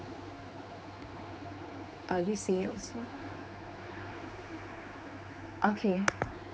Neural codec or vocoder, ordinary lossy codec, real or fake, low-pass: codec, 16 kHz, 4 kbps, X-Codec, HuBERT features, trained on general audio; none; fake; none